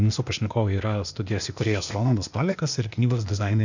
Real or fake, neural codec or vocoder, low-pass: fake; codec, 16 kHz, 0.8 kbps, ZipCodec; 7.2 kHz